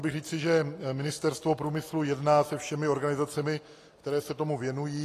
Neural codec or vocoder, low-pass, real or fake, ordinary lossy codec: none; 14.4 kHz; real; AAC, 48 kbps